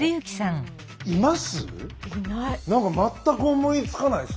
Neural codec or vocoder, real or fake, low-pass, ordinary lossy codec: none; real; none; none